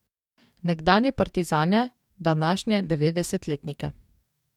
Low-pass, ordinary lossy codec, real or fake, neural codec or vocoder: 19.8 kHz; MP3, 96 kbps; fake; codec, 44.1 kHz, 2.6 kbps, DAC